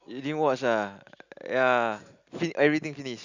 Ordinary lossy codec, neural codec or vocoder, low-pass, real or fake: Opus, 64 kbps; none; 7.2 kHz; real